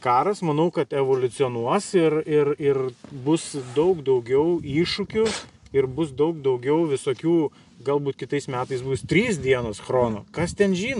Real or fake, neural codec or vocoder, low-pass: real; none; 10.8 kHz